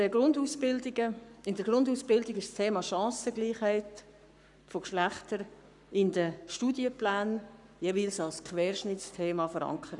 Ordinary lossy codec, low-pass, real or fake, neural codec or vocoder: none; 10.8 kHz; fake; codec, 44.1 kHz, 7.8 kbps, Pupu-Codec